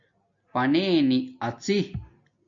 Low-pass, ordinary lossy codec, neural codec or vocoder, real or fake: 7.2 kHz; MP3, 64 kbps; none; real